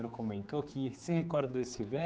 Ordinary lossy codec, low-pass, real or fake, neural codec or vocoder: none; none; fake; codec, 16 kHz, 4 kbps, X-Codec, HuBERT features, trained on general audio